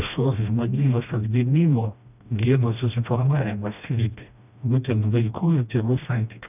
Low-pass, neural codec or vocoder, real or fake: 3.6 kHz; codec, 16 kHz, 1 kbps, FreqCodec, smaller model; fake